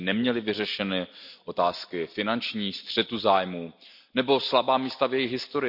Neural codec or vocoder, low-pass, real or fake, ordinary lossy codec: none; 5.4 kHz; real; none